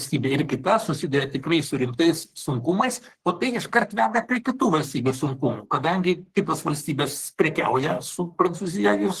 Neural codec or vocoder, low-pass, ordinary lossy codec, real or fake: codec, 44.1 kHz, 3.4 kbps, Pupu-Codec; 14.4 kHz; Opus, 16 kbps; fake